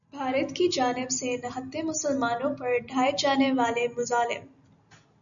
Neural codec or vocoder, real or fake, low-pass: none; real; 7.2 kHz